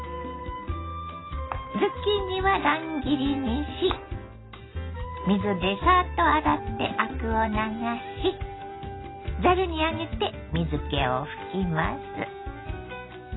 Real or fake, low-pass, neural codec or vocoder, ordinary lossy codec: real; 7.2 kHz; none; AAC, 16 kbps